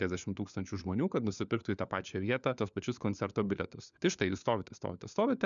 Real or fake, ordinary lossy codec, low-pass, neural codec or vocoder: fake; MP3, 96 kbps; 7.2 kHz; codec, 16 kHz, 4 kbps, FunCodec, trained on LibriTTS, 50 frames a second